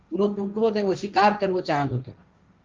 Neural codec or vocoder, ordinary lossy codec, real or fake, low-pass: codec, 16 kHz, 1.1 kbps, Voila-Tokenizer; Opus, 24 kbps; fake; 7.2 kHz